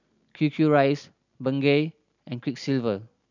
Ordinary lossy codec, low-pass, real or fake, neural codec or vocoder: none; 7.2 kHz; real; none